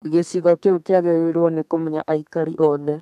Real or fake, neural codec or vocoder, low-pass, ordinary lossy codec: fake; codec, 32 kHz, 1.9 kbps, SNAC; 14.4 kHz; none